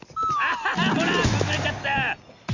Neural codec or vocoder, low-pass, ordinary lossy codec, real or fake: none; 7.2 kHz; AAC, 48 kbps; real